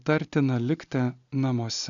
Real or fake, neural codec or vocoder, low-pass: real; none; 7.2 kHz